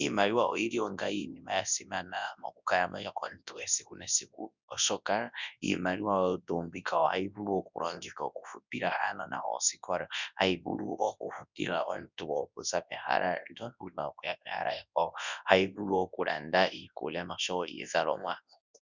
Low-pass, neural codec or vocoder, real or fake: 7.2 kHz; codec, 24 kHz, 0.9 kbps, WavTokenizer, large speech release; fake